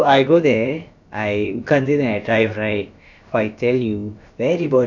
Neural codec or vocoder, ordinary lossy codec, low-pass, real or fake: codec, 16 kHz, about 1 kbps, DyCAST, with the encoder's durations; none; 7.2 kHz; fake